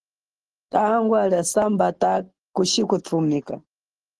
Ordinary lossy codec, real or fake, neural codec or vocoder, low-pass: Opus, 16 kbps; real; none; 10.8 kHz